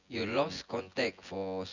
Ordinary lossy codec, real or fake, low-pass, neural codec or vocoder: Opus, 64 kbps; fake; 7.2 kHz; vocoder, 24 kHz, 100 mel bands, Vocos